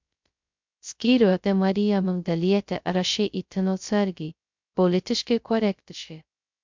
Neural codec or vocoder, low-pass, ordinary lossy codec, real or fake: codec, 16 kHz, 0.2 kbps, FocalCodec; 7.2 kHz; MP3, 64 kbps; fake